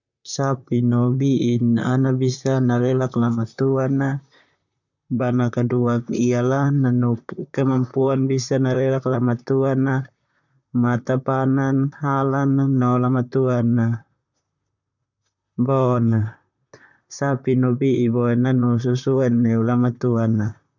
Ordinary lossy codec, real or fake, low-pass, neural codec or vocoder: none; fake; 7.2 kHz; vocoder, 44.1 kHz, 128 mel bands, Pupu-Vocoder